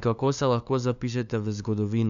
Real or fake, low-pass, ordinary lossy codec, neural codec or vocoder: fake; 7.2 kHz; none; codec, 16 kHz, 2 kbps, FunCodec, trained on LibriTTS, 25 frames a second